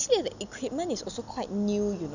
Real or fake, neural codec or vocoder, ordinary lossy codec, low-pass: real; none; none; 7.2 kHz